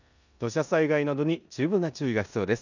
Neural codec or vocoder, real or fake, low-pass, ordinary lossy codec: codec, 16 kHz in and 24 kHz out, 0.9 kbps, LongCat-Audio-Codec, four codebook decoder; fake; 7.2 kHz; none